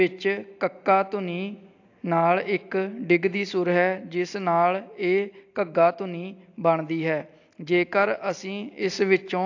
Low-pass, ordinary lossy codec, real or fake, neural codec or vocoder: 7.2 kHz; AAC, 48 kbps; real; none